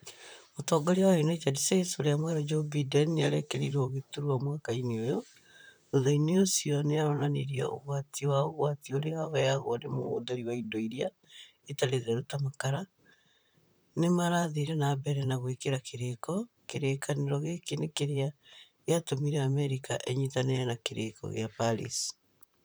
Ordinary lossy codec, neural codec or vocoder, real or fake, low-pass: none; vocoder, 44.1 kHz, 128 mel bands, Pupu-Vocoder; fake; none